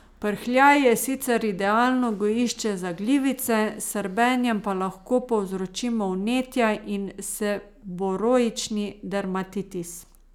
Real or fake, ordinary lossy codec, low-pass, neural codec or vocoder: real; none; 19.8 kHz; none